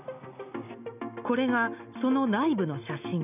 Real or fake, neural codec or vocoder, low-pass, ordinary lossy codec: real; none; 3.6 kHz; none